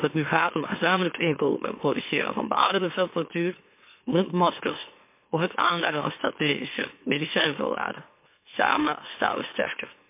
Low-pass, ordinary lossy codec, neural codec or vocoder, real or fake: 3.6 kHz; MP3, 24 kbps; autoencoder, 44.1 kHz, a latent of 192 numbers a frame, MeloTTS; fake